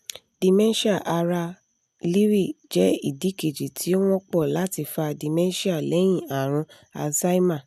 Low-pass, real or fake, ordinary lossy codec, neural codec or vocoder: 14.4 kHz; real; none; none